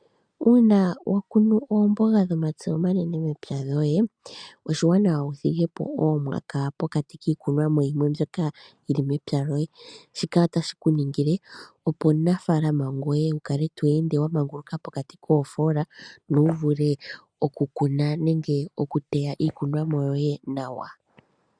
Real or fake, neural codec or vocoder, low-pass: real; none; 9.9 kHz